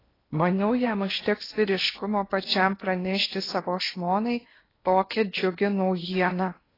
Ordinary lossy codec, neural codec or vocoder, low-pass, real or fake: AAC, 24 kbps; codec, 16 kHz in and 24 kHz out, 0.8 kbps, FocalCodec, streaming, 65536 codes; 5.4 kHz; fake